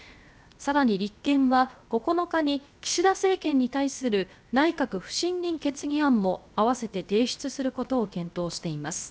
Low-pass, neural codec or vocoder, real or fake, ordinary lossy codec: none; codec, 16 kHz, 0.7 kbps, FocalCodec; fake; none